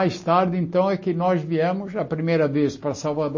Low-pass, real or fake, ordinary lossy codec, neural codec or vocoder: 7.2 kHz; real; MP3, 32 kbps; none